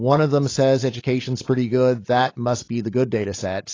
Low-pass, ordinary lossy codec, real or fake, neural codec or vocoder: 7.2 kHz; AAC, 32 kbps; fake; codec, 16 kHz, 16 kbps, FreqCodec, larger model